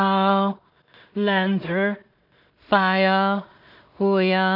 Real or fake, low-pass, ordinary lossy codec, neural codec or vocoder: fake; 5.4 kHz; none; codec, 16 kHz in and 24 kHz out, 0.4 kbps, LongCat-Audio-Codec, two codebook decoder